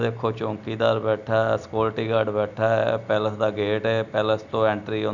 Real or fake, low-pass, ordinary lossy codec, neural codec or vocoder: real; 7.2 kHz; none; none